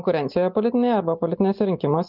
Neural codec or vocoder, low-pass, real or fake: none; 5.4 kHz; real